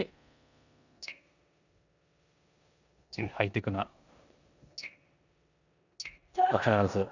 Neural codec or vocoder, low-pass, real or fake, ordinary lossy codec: codec, 16 kHz in and 24 kHz out, 0.9 kbps, LongCat-Audio-Codec, four codebook decoder; 7.2 kHz; fake; none